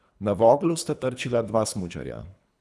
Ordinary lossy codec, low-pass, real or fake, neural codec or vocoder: none; none; fake; codec, 24 kHz, 3 kbps, HILCodec